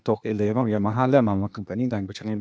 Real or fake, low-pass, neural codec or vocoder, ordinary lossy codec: fake; none; codec, 16 kHz, 0.8 kbps, ZipCodec; none